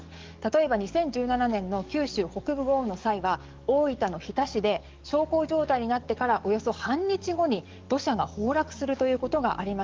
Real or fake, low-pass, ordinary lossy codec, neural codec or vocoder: fake; 7.2 kHz; Opus, 32 kbps; codec, 44.1 kHz, 7.8 kbps, DAC